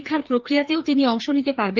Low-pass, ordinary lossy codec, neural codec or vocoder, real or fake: 7.2 kHz; Opus, 32 kbps; codec, 16 kHz, 2 kbps, FreqCodec, larger model; fake